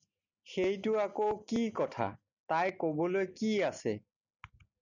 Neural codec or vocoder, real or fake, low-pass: none; real; 7.2 kHz